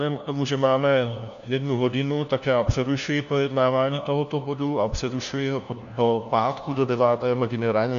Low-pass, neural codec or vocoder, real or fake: 7.2 kHz; codec, 16 kHz, 1 kbps, FunCodec, trained on LibriTTS, 50 frames a second; fake